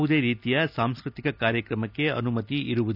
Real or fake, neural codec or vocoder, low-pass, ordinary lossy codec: real; none; 5.4 kHz; none